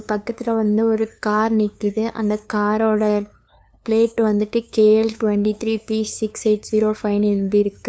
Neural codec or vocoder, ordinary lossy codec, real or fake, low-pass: codec, 16 kHz, 2 kbps, FunCodec, trained on LibriTTS, 25 frames a second; none; fake; none